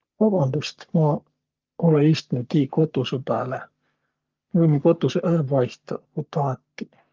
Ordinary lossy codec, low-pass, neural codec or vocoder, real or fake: Opus, 24 kbps; 7.2 kHz; codec, 44.1 kHz, 3.4 kbps, Pupu-Codec; fake